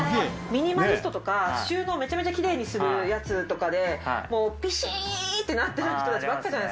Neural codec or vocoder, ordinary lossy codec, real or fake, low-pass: none; none; real; none